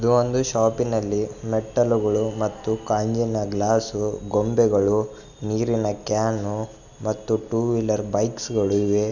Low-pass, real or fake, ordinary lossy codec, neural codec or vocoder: 7.2 kHz; real; none; none